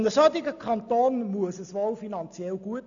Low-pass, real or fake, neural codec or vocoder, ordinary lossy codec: 7.2 kHz; real; none; AAC, 64 kbps